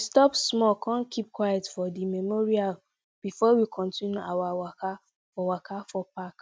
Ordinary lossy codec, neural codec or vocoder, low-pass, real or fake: none; none; none; real